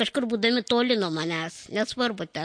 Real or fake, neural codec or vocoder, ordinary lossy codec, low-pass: real; none; MP3, 48 kbps; 9.9 kHz